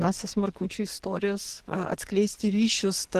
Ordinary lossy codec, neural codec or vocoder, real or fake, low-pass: Opus, 16 kbps; codec, 44.1 kHz, 2.6 kbps, SNAC; fake; 14.4 kHz